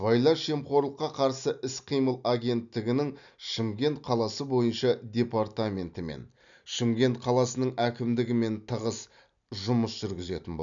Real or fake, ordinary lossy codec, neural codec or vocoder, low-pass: real; none; none; 7.2 kHz